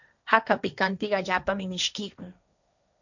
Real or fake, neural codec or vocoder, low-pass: fake; codec, 16 kHz, 1.1 kbps, Voila-Tokenizer; 7.2 kHz